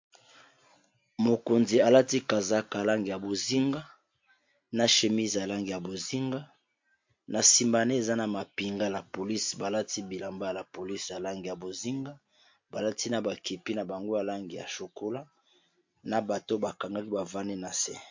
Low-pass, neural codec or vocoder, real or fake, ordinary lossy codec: 7.2 kHz; none; real; MP3, 48 kbps